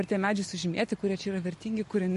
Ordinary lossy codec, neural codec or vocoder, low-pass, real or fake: MP3, 48 kbps; none; 14.4 kHz; real